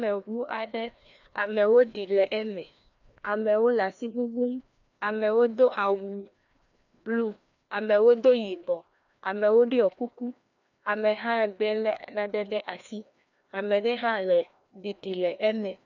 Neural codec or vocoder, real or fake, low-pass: codec, 16 kHz, 1 kbps, FreqCodec, larger model; fake; 7.2 kHz